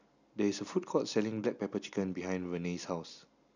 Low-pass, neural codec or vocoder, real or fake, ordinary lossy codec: 7.2 kHz; none; real; none